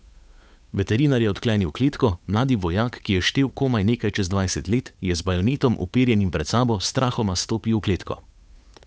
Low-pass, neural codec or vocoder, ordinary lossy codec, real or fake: none; codec, 16 kHz, 8 kbps, FunCodec, trained on Chinese and English, 25 frames a second; none; fake